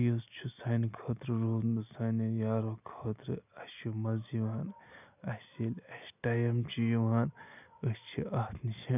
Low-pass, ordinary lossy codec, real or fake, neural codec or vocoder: 3.6 kHz; none; real; none